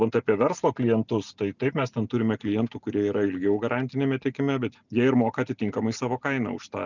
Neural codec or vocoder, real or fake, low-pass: none; real; 7.2 kHz